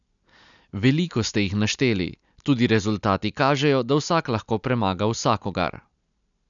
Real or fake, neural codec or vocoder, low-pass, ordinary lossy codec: real; none; 7.2 kHz; none